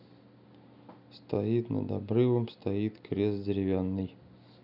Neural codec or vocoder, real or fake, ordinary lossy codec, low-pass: none; real; none; 5.4 kHz